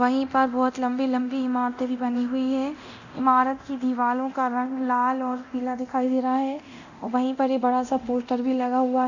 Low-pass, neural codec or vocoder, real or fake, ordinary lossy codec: 7.2 kHz; codec, 24 kHz, 0.9 kbps, DualCodec; fake; none